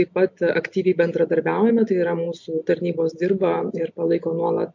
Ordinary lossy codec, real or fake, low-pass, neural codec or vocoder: MP3, 64 kbps; real; 7.2 kHz; none